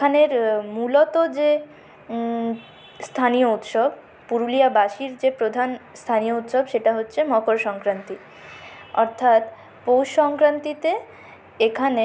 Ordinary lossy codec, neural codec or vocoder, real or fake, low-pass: none; none; real; none